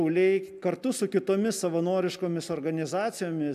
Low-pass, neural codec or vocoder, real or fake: 14.4 kHz; none; real